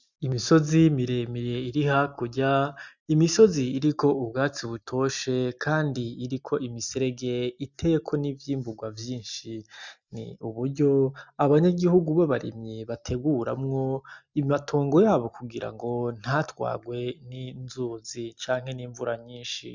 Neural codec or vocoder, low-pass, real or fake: none; 7.2 kHz; real